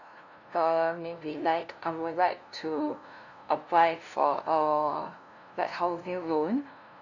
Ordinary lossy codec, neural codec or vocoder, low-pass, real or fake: none; codec, 16 kHz, 0.5 kbps, FunCodec, trained on LibriTTS, 25 frames a second; 7.2 kHz; fake